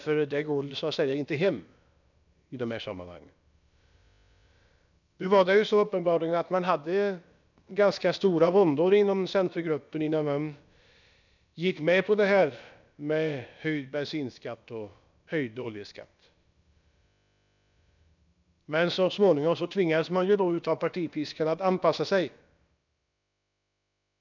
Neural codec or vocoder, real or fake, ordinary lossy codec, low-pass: codec, 16 kHz, about 1 kbps, DyCAST, with the encoder's durations; fake; none; 7.2 kHz